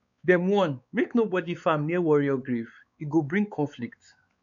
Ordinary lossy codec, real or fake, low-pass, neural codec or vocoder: none; fake; 7.2 kHz; codec, 16 kHz, 4 kbps, X-Codec, WavLM features, trained on Multilingual LibriSpeech